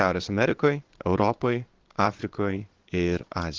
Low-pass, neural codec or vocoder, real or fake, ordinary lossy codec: 7.2 kHz; vocoder, 22.05 kHz, 80 mel bands, Vocos; fake; Opus, 16 kbps